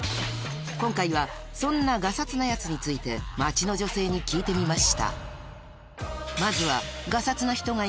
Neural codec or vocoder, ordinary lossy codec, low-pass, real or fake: none; none; none; real